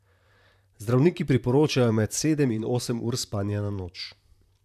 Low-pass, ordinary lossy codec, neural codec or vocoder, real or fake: 14.4 kHz; none; vocoder, 44.1 kHz, 128 mel bands, Pupu-Vocoder; fake